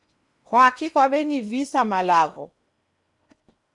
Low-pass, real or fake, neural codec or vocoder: 10.8 kHz; fake; codec, 16 kHz in and 24 kHz out, 0.8 kbps, FocalCodec, streaming, 65536 codes